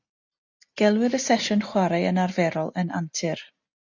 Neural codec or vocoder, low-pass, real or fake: none; 7.2 kHz; real